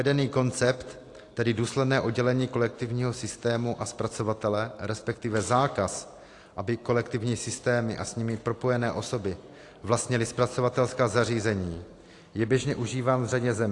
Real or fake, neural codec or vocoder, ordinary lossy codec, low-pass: real; none; AAC, 48 kbps; 10.8 kHz